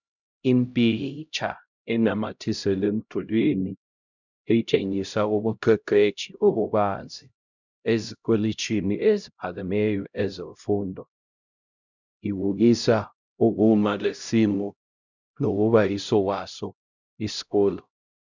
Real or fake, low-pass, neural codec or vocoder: fake; 7.2 kHz; codec, 16 kHz, 0.5 kbps, X-Codec, HuBERT features, trained on LibriSpeech